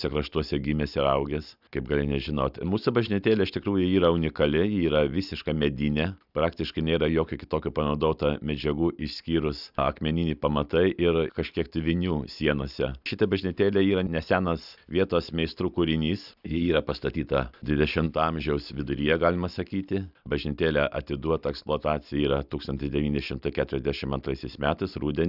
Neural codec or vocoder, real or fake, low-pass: none; real; 5.4 kHz